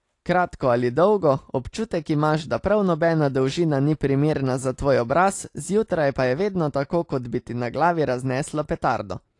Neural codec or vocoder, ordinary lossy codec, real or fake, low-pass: none; AAC, 48 kbps; real; 10.8 kHz